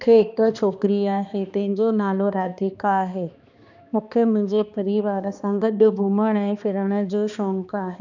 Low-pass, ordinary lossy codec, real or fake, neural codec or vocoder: 7.2 kHz; none; fake; codec, 16 kHz, 2 kbps, X-Codec, HuBERT features, trained on balanced general audio